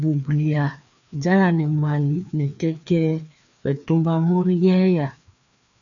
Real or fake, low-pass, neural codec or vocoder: fake; 7.2 kHz; codec, 16 kHz, 2 kbps, FreqCodec, larger model